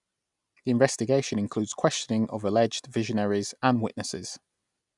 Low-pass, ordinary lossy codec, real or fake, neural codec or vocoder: 10.8 kHz; none; real; none